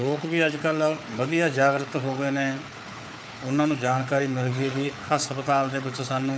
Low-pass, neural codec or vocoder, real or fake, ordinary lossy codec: none; codec, 16 kHz, 4 kbps, FunCodec, trained on Chinese and English, 50 frames a second; fake; none